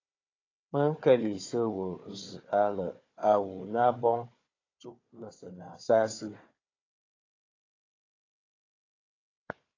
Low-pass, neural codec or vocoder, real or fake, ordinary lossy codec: 7.2 kHz; codec, 16 kHz, 4 kbps, FunCodec, trained on Chinese and English, 50 frames a second; fake; AAC, 32 kbps